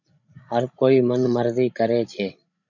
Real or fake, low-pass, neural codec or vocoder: fake; 7.2 kHz; codec, 16 kHz, 16 kbps, FreqCodec, larger model